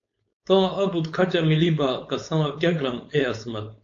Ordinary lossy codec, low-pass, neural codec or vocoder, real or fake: AAC, 48 kbps; 7.2 kHz; codec, 16 kHz, 4.8 kbps, FACodec; fake